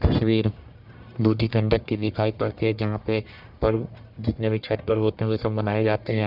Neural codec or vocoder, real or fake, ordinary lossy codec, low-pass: codec, 44.1 kHz, 1.7 kbps, Pupu-Codec; fake; none; 5.4 kHz